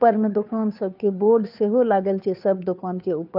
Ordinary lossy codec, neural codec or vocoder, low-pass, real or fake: none; codec, 16 kHz, 2 kbps, FunCodec, trained on Chinese and English, 25 frames a second; 5.4 kHz; fake